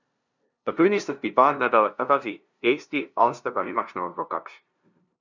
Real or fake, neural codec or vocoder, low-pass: fake; codec, 16 kHz, 0.5 kbps, FunCodec, trained on LibriTTS, 25 frames a second; 7.2 kHz